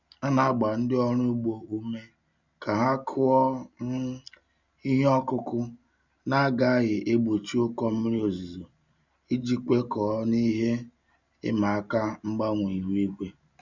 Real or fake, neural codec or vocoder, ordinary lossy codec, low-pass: real; none; none; 7.2 kHz